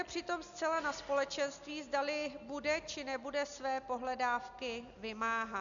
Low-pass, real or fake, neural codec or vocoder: 7.2 kHz; real; none